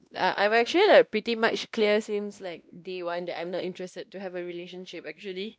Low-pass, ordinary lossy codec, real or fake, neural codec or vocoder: none; none; fake; codec, 16 kHz, 1 kbps, X-Codec, WavLM features, trained on Multilingual LibriSpeech